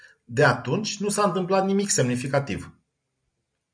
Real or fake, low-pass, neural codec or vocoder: real; 9.9 kHz; none